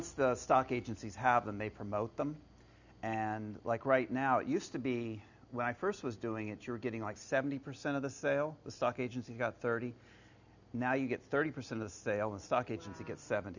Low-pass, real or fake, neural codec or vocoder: 7.2 kHz; real; none